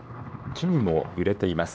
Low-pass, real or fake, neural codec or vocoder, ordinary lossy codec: none; fake; codec, 16 kHz, 2 kbps, X-Codec, HuBERT features, trained on LibriSpeech; none